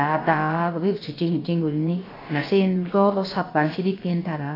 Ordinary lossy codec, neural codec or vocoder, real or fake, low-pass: AAC, 24 kbps; codec, 16 kHz, about 1 kbps, DyCAST, with the encoder's durations; fake; 5.4 kHz